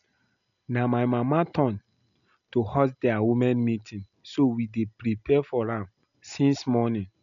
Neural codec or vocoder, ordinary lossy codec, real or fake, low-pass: none; none; real; 7.2 kHz